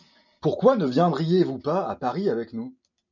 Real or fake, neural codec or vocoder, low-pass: real; none; 7.2 kHz